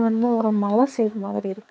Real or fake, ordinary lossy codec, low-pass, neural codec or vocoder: fake; none; none; codec, 16 kHz, 4 kbps, X-Codec, HuBERT features, trained on balanced general audio